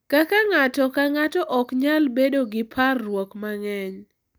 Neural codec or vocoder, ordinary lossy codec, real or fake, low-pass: none; none; real; none